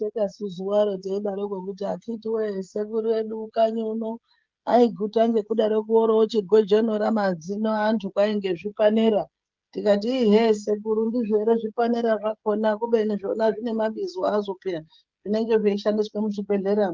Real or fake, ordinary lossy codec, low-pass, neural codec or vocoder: fake; Opus, 32 kbps; 7.2 kHz; codec, 16 kHz, 16 kbps, FreqCodec, smaller model